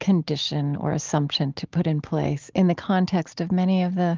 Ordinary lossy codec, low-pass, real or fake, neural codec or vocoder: Opus, 32 kbps; 7.2 kHz; real; none